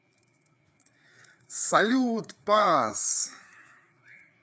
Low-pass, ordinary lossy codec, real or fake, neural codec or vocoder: none; none; fake; codec, 16 kHz, 4 kbps, FreqCodec, larger model